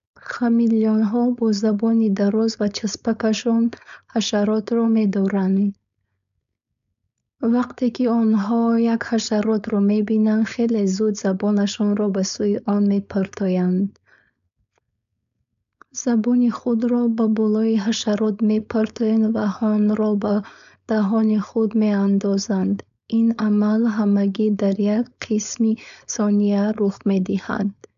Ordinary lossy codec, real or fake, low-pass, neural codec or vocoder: AAC, 96 kbps; fake; 7.2 kHz; codec, 16 kHz, 4.8 kbps, FACodec